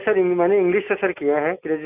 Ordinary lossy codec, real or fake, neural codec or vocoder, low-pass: none; real; none; 3.6 kHz